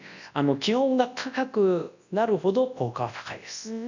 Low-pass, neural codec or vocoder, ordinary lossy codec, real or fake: 7.2 kHz; codec, 24 kHz, 0.9 kbps, WavTokenizer, large speech release; none; fake